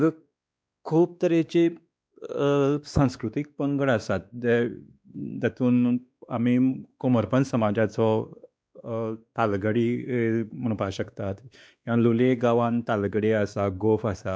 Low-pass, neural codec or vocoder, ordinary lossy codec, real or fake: none; codec, 16 kHz, 2 kbps, X-Codec, WavLM features, trained on Multilingual LibriSpeech; none; fake